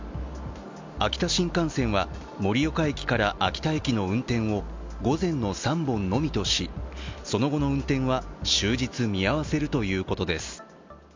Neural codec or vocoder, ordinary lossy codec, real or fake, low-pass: none; MP3, 64 kbps; real; 7.2 kHz